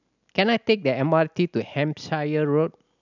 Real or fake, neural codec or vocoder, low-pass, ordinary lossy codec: real; none; 7.2 kHz; none